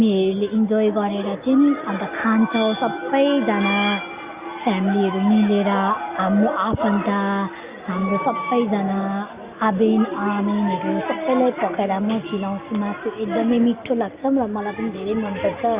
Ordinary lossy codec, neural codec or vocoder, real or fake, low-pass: Opus, 64 kbps; none; real; 3.6 kHz